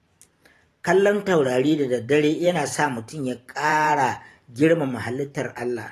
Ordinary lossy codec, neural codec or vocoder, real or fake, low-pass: AAC, 48 kbps; vocoder, 44.1 kHz, 128 mel bands every 512 samples, BigVGAN v2; fake; 14.4 kHz